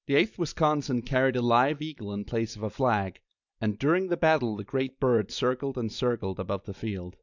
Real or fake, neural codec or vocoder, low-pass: real; none; 7.2 kHz